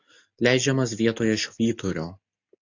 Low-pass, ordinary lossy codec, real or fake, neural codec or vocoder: 7.2 kHz; AAC, 48 kbps; real; none